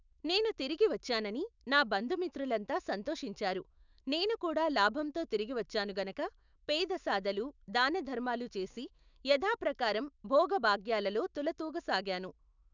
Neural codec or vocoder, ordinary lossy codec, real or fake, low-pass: autoencoder, 48 kHz, 128 numbers a frame, DAC-VAE, trained on Japanese speech; none; fake; 7.2 kHz